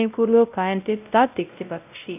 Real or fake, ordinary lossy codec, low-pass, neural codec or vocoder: fake; none; 3.6 kHz; codec, 16 kHz, 0.5 kbps, X-Codec, WavLM features, trained on Multilingual LibriSpeech